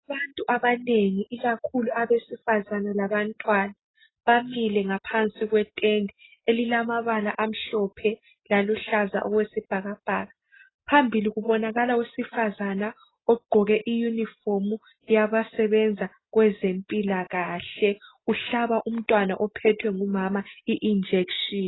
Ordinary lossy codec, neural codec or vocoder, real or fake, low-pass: AAC, 16 kbps; none; real; 7.2 kHz